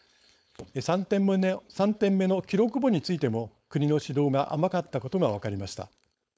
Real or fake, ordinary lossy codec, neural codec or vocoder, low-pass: fake; none; codec, 16 kHz, 4.8 kbps, FACodec; none